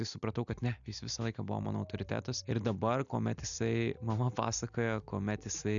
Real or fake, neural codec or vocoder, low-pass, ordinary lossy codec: real; none; 7.2 kHz; MP3, 96 kbps